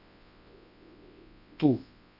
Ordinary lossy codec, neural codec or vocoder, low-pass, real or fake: none; codec, 24 kHz, 0.9 kbps, WavTokenizer, large speech release; 5.4 kHz; fake